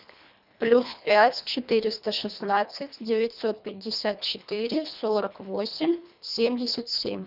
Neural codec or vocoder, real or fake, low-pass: codec, 24 kHz, 1.5 kbps, HILCodec; fake; 5.4 kHz